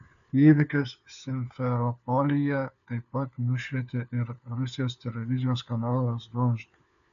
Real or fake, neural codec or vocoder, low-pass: fake; codec, 16 kHz, 4 kbps, FunCodec, trained on LibriTTS, 50 frames a second; 7.2 kHz